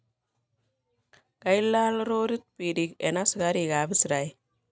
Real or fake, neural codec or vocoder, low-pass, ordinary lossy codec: real; none; none; none